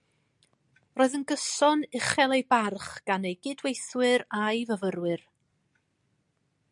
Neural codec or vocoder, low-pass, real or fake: none; 10.8 kHz; real